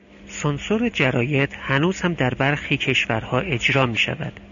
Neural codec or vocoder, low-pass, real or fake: none; 7.2 kHz; real